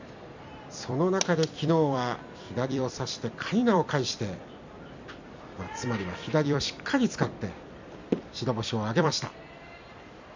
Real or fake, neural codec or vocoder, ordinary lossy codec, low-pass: fake; vocoder, 44.1 kHz, 128 mel bands every 256 samples, BigVGAN v2; MP3, 64 kbps; 7.2 kHz